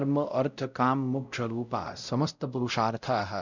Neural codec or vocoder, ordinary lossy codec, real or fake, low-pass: codec, 16 kHz, 0.5 kbps, X-Codec, WavLM features, trained on Multilingual LibriSpeech; none; fake; 7.2 kHz